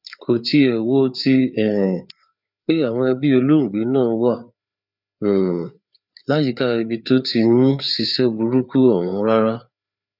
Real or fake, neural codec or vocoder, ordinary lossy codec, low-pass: fake; codec, 16 kHz, 4 kbps, FreqCodec, larger model; none; 5.4 kHz